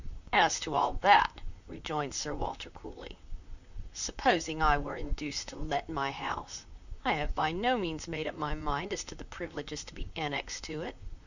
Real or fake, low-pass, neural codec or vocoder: fake; 7.2 kHz; vocoder, 44.1 kHz, 128 mel bands, Pupu-Vocoder